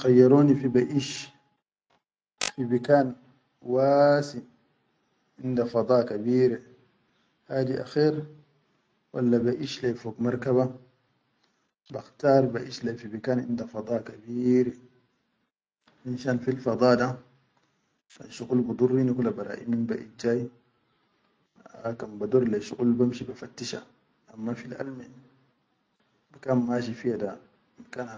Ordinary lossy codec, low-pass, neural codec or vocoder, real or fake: none; none; none; real